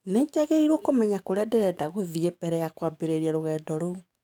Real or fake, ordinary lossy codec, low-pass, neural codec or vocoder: fake; none; 19.8 kHz; codec, 44.1 kHz, 7.8 kbps, DAC